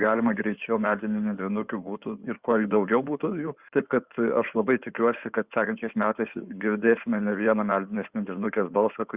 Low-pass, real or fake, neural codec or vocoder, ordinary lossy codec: 3.6 kHz; fake; codec, 16 kHz in and 24 kHz out, 2.2 kbps, FireRedTTS-2 codec; Opus, 64 kbps